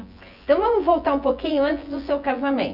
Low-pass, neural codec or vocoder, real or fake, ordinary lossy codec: 5.4 kHz; vocoder, 24 kHz, 100 mel bands, Vocos; fake; none